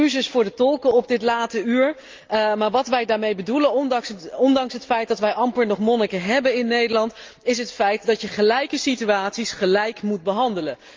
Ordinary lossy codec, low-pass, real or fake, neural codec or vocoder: Opus, 24 kbps; 7.2 kHz; real; none